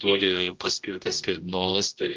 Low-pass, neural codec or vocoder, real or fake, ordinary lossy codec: 7.2 kHz; codec, 16 kHz, 0.5 kbps, X-Codec, HuBERT features, trained on general audio; fake; Opus, 24 kbps